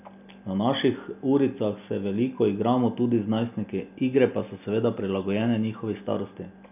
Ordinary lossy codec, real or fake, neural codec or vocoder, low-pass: none; real; none; 3.6 kHz